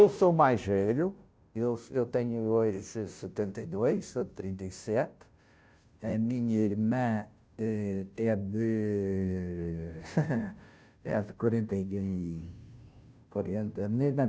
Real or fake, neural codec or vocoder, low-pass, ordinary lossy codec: fake; codec, 16 kHz, 0.5 kbps, FunCodec, trained on Chinese and English, 25 frames a second; none; none